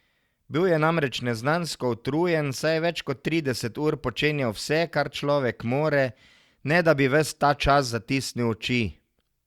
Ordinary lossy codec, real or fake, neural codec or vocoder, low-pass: Opus, 64 kbps; real; none; 19.8 kHz